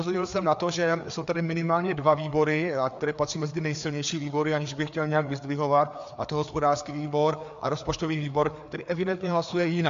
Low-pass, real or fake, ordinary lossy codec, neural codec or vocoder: 7.2 kHz; fake; MP3, 64 kbps; codec, 16 kHz, 4 kbps, FreqCodec, larger model